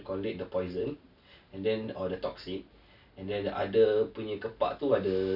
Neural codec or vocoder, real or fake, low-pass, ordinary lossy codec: none; real; 5.4 kHz; none